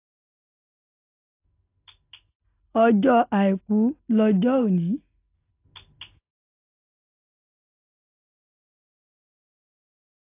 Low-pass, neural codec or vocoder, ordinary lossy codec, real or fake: 3.6 kHz; none; AAC, 24 kbps; real